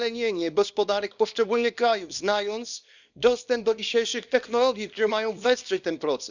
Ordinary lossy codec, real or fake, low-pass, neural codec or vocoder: none; fake; 7.2 kHz; codec, 24 kHz, 0.9 kbps, WavTokenizer, small release